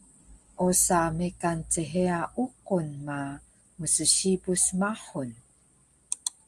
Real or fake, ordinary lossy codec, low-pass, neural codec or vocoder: real; Opus, 24 kbps; 10.8 kHz; none